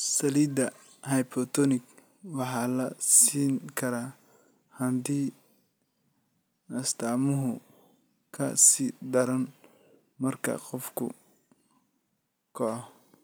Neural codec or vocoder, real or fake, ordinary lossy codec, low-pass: none; real; none; none